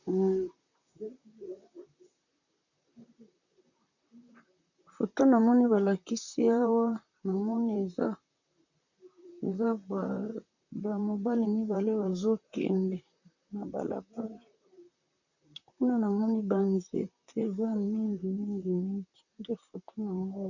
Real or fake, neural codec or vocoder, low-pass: fake; vocoder, 44.1 kHz, 128 mel bands, Pupu-Vocoder; 7.2 kHz